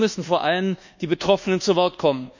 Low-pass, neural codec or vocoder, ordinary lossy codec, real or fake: 7.2 kHz; codec, 24 kHz, 1.2 kbps, DualCodec; none; fake